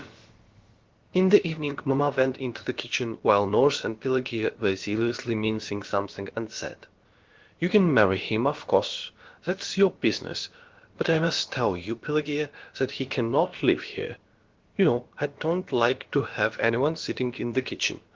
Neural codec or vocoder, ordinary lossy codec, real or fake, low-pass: codec, 16 kHz, about 1 kbps, DyCAST, with the encoder's durations; Opus, 16 kbps; fake; 7.2 kHz